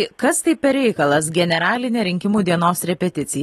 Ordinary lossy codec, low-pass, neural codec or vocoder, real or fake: AAC, 32 kbps; 19.8 kHz; none; real